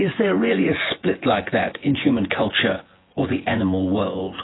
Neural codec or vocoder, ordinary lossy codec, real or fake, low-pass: vocoder, 24 kHz, 100 mel bands, Vocos; AAC, 16 kbps; fake; 7.2 kHz